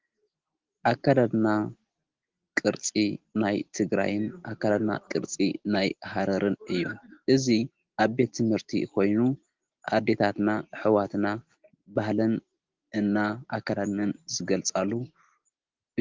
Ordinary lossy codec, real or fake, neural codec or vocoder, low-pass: Opus, 16 kbps; real; none; 7.2 kHz